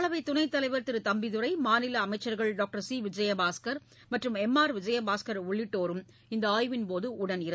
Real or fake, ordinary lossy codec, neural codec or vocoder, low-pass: real; none; none; none